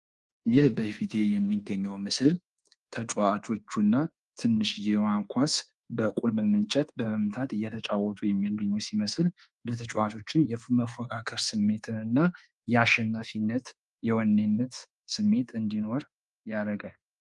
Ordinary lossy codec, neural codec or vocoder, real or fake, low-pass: Opus, 24 kbps; codec, 24 kHz, 1.2 kbps, DualCodec; fake; 10.8 kHz